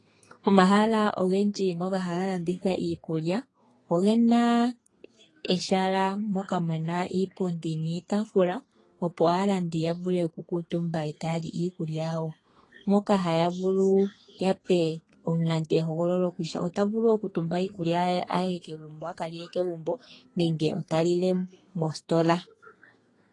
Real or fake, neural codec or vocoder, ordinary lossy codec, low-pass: fake; codec, 32 kHz, 1.9 kbps, SNAC; AAC, 32 kbps; 10.8 kHz